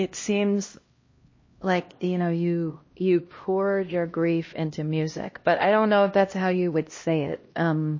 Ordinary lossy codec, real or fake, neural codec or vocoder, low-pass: MP3, 32 kbps; fake; codec, 16 kHz, 1 kbps, X-Codec, HuBERT features, trained on LibriSpeech; 7.2 kHz